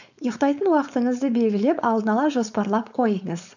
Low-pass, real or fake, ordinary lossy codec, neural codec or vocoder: 7.2 kHz; fake; none; codec, 16 kHz, 4.8 kbps, FACodec